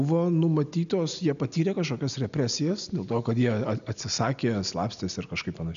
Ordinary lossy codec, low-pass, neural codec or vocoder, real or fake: AAC, 96 kbps; 7.2 kHz; none; real